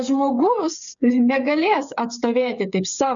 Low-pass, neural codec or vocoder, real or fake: 7.2 kHz; codec, 16 kHz, 8 kbps, FreqCodec, smaller model; fake